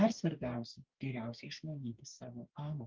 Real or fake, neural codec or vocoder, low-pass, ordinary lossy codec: fake; codec, 44.1 kHz, 2.6 kbps, DAC; 7.2 kHz; Opus, 32 kbps